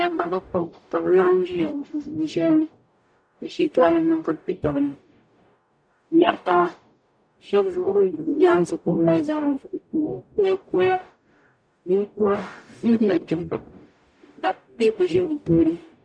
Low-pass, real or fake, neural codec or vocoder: 9.9 kHz; fake; codec, 44.1 kHz, 0.9 kbps, DAC